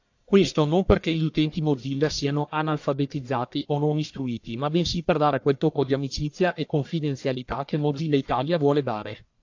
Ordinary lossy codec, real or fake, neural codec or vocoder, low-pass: AAC, 48 kbps; fake; codec, 44.1 kHz, 1.7 kbps, Pupu-Codec; 7.2 kHz